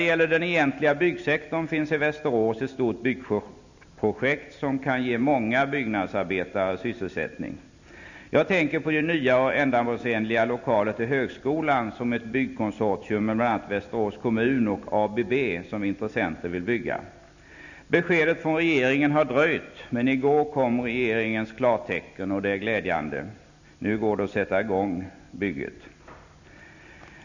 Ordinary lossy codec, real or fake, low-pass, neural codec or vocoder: none; real; 7.2 kHz; none